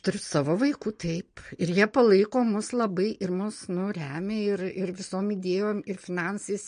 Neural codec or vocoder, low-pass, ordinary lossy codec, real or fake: none; 9.9 kHz; MP3, 48 kbps; real